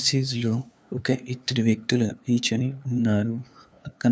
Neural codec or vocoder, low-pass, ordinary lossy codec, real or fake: codec, 16 kHz, 2 kbps, FunCodec, trained on LibriTTS, 25 frames a second; none; none; fake